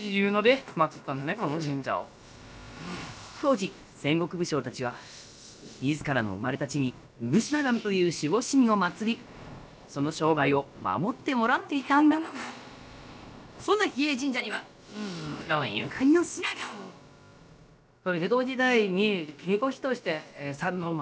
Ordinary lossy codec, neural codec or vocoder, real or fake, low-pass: none; codec, 16 kHz, about 1 kbps, DyCAST, with the encoder's durations; fake; none